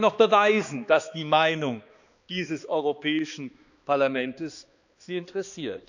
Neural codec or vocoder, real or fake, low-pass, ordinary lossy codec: codec, 16 kHz, 2 kbps, X-Codec, HuBERT features, trained on balanced general audio; fake; 7.2 kHz; none